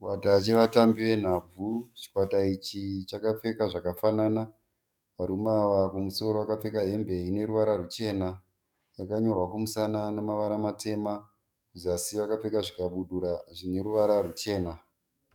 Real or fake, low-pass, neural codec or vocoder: fake; 19.8 kHz; codec, 44.1 kHz, 7.8 kbps, DAC